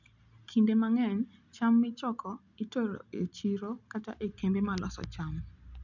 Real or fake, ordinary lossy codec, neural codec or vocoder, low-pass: real; none; none; 7.2 kHz